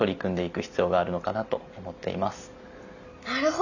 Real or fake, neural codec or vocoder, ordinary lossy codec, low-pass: real; none; none; 7.2 kHz